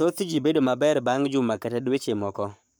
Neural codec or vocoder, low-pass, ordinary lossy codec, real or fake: codec, 44.1 kHz, 7.8 kbps, Pupu-Codec; none; none; fake